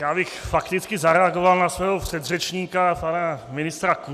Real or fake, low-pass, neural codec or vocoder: real; 14.4 kHz; none